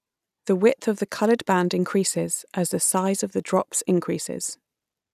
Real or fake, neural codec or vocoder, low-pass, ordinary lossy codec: real; none; 14.4 kHz; none